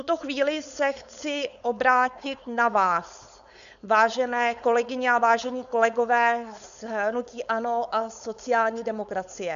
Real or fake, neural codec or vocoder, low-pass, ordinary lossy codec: fake; codec, 16 kHz, 4.8 kbps, FACodec; 7.2 kHz; MP3, 96 kbps